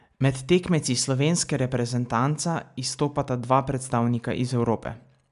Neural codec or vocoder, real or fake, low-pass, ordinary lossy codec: none; real; 10.8 kHz; none